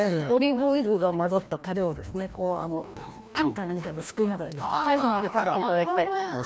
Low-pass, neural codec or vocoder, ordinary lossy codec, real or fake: none; codec, 16 kHz, 1 kbps, FreqCodec, larger model; none; fake